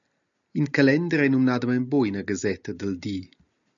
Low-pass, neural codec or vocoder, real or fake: 7.2 kHz; none; real